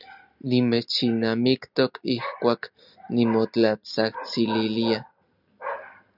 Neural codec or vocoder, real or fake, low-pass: none; real; 5.4 kHz